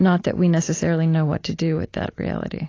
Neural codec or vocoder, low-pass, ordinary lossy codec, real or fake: none; 7.2 kHz; AAC, 32 kbps; real